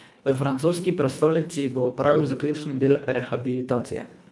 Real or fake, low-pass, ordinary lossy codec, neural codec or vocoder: fake; none; none; codec, 24 kHz, 1.5 kbps, HILCodec